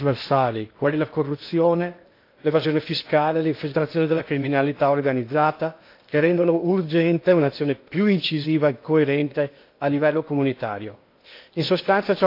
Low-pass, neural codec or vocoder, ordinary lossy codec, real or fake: 5.4 kHz; codec, 16 kHz in and 24 kHz out, 0.8 kbps, FocalCodec, streaming, 65536 codes; AAC, 32 kbps; fake